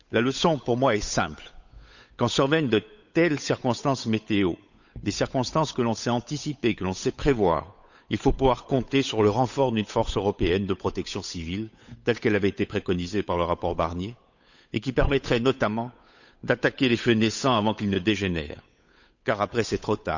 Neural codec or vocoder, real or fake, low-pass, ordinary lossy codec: codec, 16 kHz, 8 kbps, FunCodec, trained on Chinese and English, 25 frames a second; fake; 7.2 kHz; none